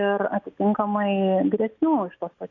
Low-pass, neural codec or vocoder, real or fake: 7.2 kHz; none; real